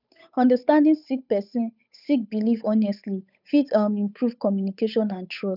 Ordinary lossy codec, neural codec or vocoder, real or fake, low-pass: none; codec, 16 kHz, 8 kbps, FunCodec, trained on Chinese and English, 25 frames a second; fake; 5.4 kHz